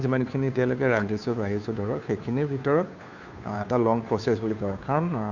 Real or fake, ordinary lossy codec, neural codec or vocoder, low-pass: fake; none; codec, 16 kHz, 2 kbps, FunCodec, trained on Chinese and English, 25 frames a second; 7.2 kHz